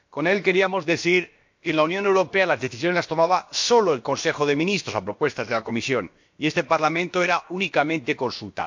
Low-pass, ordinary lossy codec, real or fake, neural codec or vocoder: 7.2 kHz; MP3, 48 kbps; fake; codec, 16 kHz, about 1 kbps, DyCAST, with the encoder's durations